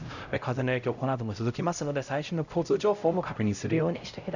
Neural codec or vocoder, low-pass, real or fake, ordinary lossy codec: codec, 16 kHz, 0.5 kbps, X-Codec, HuBERT features, trained on LibriSpeech; 7.2 kHz; fake; none